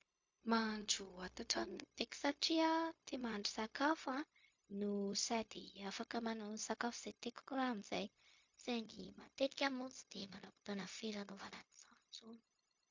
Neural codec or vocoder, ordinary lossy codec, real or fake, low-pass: codec, 16 kHz, 0.4 kbps, LongCat-Audio-Codec; MP3, 48 kbps; fake; 7.2 kHz